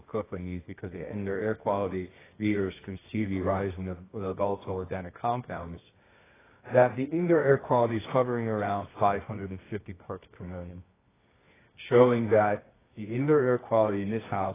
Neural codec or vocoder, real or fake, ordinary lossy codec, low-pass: codec, 24 kHz, 0.9 kbps, WavTokenizer, medium music audio release; fake; AAC, 16 kbps; 3.6 kHz